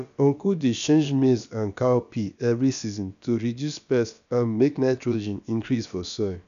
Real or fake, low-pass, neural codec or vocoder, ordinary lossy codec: fake; 7.2 kHz; codec, 16 kHz, about 1 kbps, DyCAST, with the encoder's durations; none